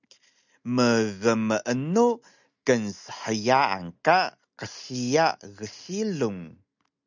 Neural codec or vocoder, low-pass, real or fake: none; 7.2 kHz; real